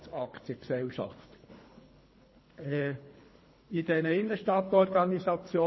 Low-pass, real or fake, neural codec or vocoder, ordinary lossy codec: 7.2 kHz; fake; codec, 24 kHz, 3 kbps, HILCodec; MP3, 24 kbps